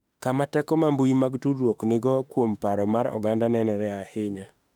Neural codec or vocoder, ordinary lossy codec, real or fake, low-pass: autoencoder, 48 kHz, 32 numbers a frame, DAC-VAE, trained on Japanese speech; none; fake; 19.8 kHz